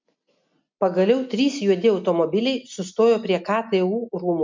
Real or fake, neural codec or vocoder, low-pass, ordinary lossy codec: real; none; 7.2 kHz; MP3, 64 kbps